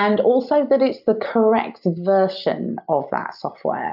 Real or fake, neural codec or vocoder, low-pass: real; none; 5.4 kHz